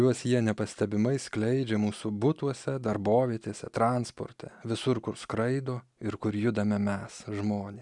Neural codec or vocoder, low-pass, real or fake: none; 10.8 kHz; real